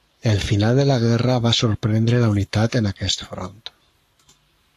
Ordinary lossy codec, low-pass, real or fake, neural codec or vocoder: AAC, 64 kbps; 14.4 kHz; fake; codec, 44.1 kHz, 7.8 kbps, Pupu-Codec